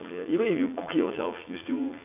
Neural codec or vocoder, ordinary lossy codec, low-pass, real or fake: vocoder, 44.1 kHz, 80 mel bands, Vocos; none; 3.6 kHz; fake